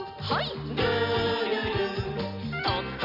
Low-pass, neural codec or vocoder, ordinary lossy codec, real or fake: 5.4 kHz; none; none; real